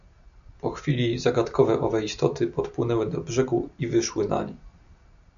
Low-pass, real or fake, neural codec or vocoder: 7.2 kHz; real; none